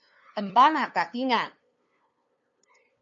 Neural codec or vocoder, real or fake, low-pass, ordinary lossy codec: codec, 16 kHz, 2 kbps, FunCodec, trained on LibriTTS, 25 frames a second; fake; 7.2 kHz; MP3, 96 kbps